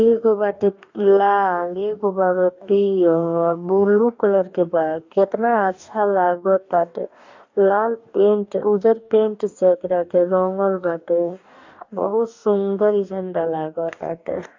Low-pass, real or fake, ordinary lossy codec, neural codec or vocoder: 7.2 kHz; fake; AAC, 48 kbps; codec, 44.1 kHz, 2.6 kbps, DAC